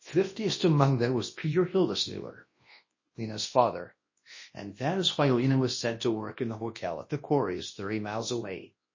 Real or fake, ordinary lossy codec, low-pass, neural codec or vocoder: fake; MP3, 32 kbps; 7.2 kHz; codec, 24 kHz, 0.9 kbps, WavTokenizer, large speech release